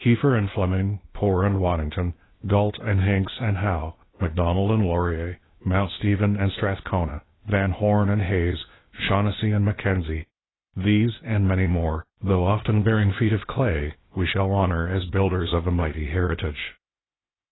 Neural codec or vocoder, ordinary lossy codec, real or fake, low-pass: codec, 16 kHz, 0.8 kbps, ZipCodec; AAC, 16 kbps; fake; 7.2 kHz